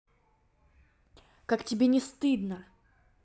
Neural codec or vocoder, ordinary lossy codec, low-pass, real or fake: none; none; none; real